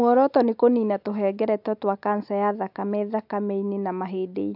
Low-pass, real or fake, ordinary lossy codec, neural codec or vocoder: 5.4 kHz; real; AAC, 48 kbps; none